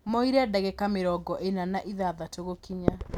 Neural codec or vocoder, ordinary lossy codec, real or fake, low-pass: none; none; real; 19.8 kHz